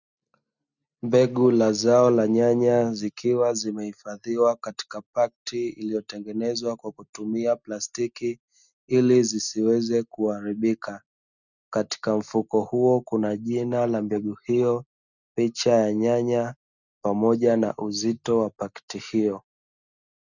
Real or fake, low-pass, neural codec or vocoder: real; 7.2 kHz; none